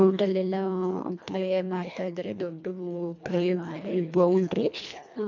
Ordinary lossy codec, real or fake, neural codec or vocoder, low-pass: none; fake; codec, 24 kHz, 1.5 kbps, HILCodec; 7.2 kHz